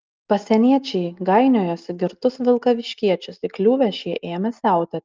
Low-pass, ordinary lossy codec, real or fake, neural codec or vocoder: 7.2 kHz; Opus, 32 kbps; real; none